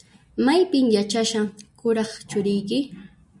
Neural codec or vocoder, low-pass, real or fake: none; 10.8 kHz; real